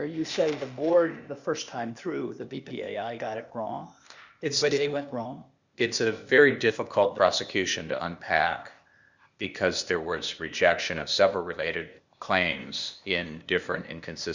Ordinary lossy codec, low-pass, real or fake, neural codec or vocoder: Opus, 64 kbps; 7.2 kHz; fake; codec, 16 kHz, 0.8 kbps, ZipCodec